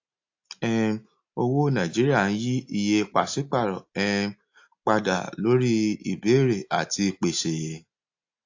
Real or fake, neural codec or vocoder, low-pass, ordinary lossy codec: real; none; 7.2 kHz; AAC, 48 kbps